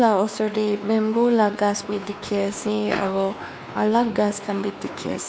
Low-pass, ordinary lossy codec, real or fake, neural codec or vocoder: none; none; fake; codec, 16 kHz, 2 kbps, X-Codec, WavLM features, trained on Multilingual LibriSpeech